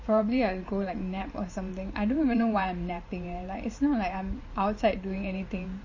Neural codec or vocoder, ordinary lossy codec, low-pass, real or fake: vocoder, 44.1 kHz, 128 mel bands every 512 samples, BigVGAN v2; MP3, 32 kbps; 7.2 kHz; fake